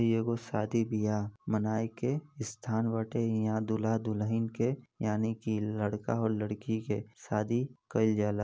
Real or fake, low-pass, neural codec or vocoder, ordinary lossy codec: real; none; none; none